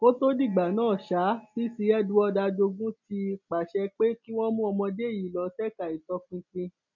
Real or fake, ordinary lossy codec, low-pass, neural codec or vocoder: real; none; 7.2 kHz; none